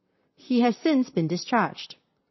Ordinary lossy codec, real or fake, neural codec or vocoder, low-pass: MP3, 24 kbps; fake; vocoder, 44.1 kHz, 128 mel bands, Pupu-Vocoder; 7.2 kHz